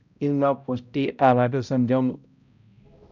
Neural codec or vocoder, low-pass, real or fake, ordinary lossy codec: codec, 16 kHz, 0.5 kbps, X-Codec, HuBERT features, trained on balanced general audio; 7.2 kHz; fake; none